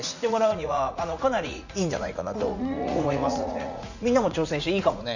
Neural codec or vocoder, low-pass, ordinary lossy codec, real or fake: vocoder, 44.1 kHz, 80 mel bands, Vocos; 7.2 kHz; none; fake